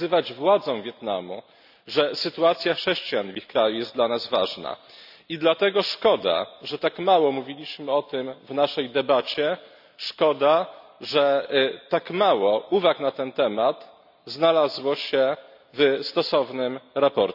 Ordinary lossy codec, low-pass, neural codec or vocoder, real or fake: none; 5.4 kHz; none; real